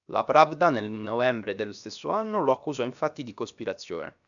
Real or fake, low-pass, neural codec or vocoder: fake; 7.2 kHz; codec, 16 kHz, about 1 kbps, DyCAST, with the encoder's durations